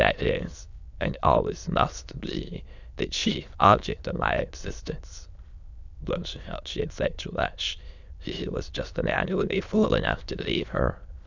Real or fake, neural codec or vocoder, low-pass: fake; autoencoder, 22.05 kHz, a latent of 192 numbers a frame, VITS, trained on many speakers; 7.2 kHz